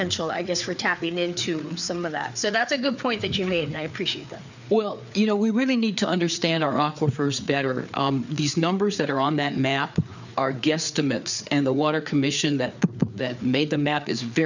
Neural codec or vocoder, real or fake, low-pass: codec, 16 kHz, 4 kbps, FreqCodec, larger model; fake; 7.2 kHz